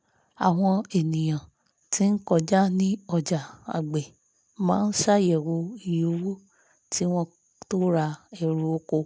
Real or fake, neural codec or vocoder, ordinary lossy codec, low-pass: real; none; none; none